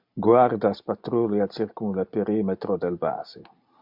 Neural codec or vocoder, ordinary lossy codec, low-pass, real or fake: none; MP3, 48 kbps; 5.4 kHz; real